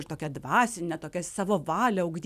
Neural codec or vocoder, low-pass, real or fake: none; 14.4 kHz; real